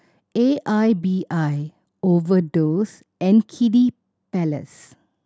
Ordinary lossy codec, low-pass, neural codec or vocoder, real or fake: none; none; none; real